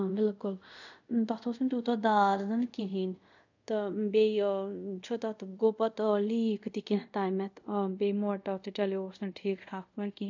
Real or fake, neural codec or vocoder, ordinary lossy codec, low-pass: fake; codec, 24 kHz, 0.5 kbps, DualCodec; none; 7.2 kHz